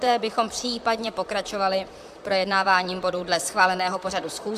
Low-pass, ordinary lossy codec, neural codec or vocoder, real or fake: 14.4 kHz; AAC, 96 kbps; vocoder, 44.1 kHz, 128 mel bands, Pupu-Vocoder; fake